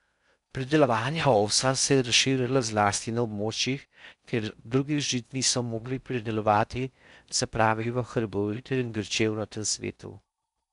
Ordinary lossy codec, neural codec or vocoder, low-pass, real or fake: none; codec, 16 kHz in and 24 kHz out, 0.6 kbps, FocalCodec, streaming, 4096 codes; 10.8 kHz; fake